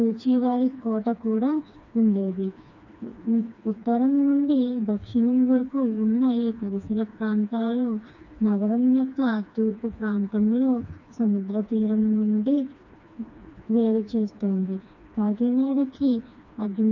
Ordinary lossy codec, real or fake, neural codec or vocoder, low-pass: none; fake; codec, 16 kHz, 2 kbps, FreqCodec, smaller model; 7.2 kHz